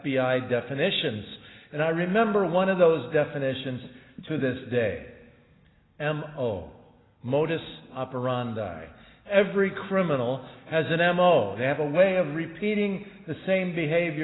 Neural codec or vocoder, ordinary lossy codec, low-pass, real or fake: none; AAC, 16 kbps; 7.2 kHz; real